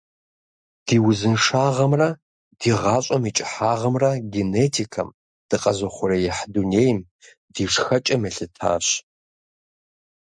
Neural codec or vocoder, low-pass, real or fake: none; 9.9 kHz; real